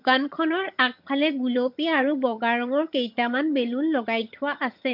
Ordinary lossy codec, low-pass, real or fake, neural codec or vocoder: MP3, 48 kbps; 5.4 kHz; fake; vocoder, 22.05 kHz, 80 mel bands, HiFi-GAN